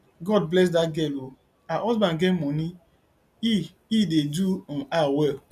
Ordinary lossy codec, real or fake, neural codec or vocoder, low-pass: none; real; none; 14.4 kHz